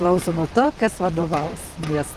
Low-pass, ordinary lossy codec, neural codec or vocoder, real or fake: 14.4 kHz; Opus, 24 kbps; vocoder, 44.1 kHz, 128 mel bands, Pupu-Vocoder; fake